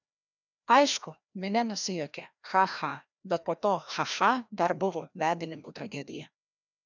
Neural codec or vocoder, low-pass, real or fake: codec, 16 kHz, 1 kbps, FreqCodec, larger model; 7.2 kHz; fake